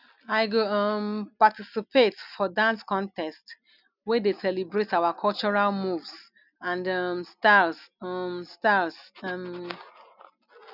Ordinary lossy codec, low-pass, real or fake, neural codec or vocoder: none; 5.4 kHz; real; none